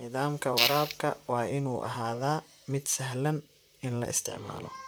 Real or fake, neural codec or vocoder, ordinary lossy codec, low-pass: fake; vocoder, 44.1 kHz, 128 mel bands, Pupu-Vocoder; none; none